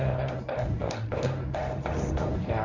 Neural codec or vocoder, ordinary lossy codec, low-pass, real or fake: codec, 24 kHz, 0.9 kbps, WavTokenizer, medium speech release version 1; none; 7.2 kHz; fake